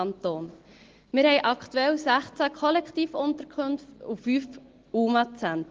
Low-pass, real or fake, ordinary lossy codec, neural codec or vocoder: 7.2 kHz; real; Opus, 16 kbps; none